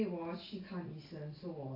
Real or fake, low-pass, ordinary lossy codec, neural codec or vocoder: real; 5.4 kHz; AAC, 24 kbps; none